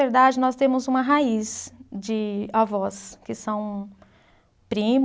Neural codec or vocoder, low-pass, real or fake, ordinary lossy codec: none; none; real; none